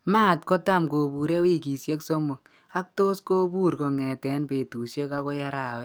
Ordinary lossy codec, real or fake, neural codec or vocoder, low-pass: none; fake; codec, 44.1 kHz, 7.8 kbps, DAC; none